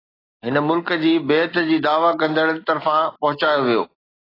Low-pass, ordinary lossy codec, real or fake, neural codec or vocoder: 5.4 kHz; AAC, 24 kbps; real; none